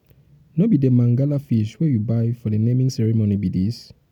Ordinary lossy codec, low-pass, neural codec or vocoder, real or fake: none; 19.8 kHz; none; real